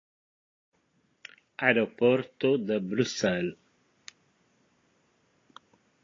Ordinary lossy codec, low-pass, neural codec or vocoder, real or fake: AAC, 32 kbps; 7.2 kHz; none; real